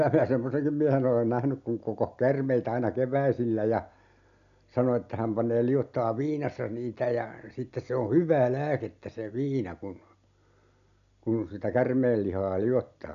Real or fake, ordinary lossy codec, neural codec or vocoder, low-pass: real; AAC, 96 kbps; none; 7.2 kHz